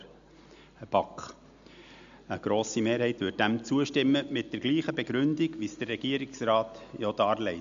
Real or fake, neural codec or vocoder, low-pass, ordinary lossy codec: real; none; 7.2 kHz; MP3, 64 kbps